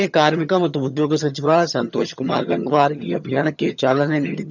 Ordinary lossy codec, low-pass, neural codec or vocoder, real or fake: none; 7.2 kHz; vocoder, 22.05 kHz, 80 mel bands, HiFi-GAN; fake